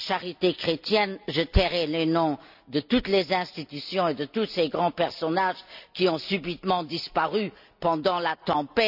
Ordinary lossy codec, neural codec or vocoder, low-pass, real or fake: none; none; 5.4 kHz; real